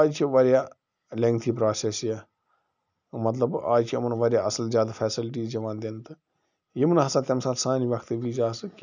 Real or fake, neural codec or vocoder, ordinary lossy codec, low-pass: real; none; none; 7.2 kHz